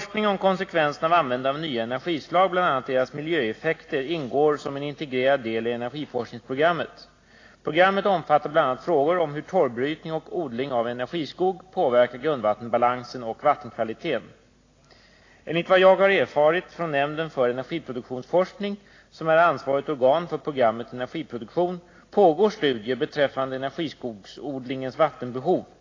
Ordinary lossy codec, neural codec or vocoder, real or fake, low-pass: AAC, 32 kbps; none; real; 7.2 kHz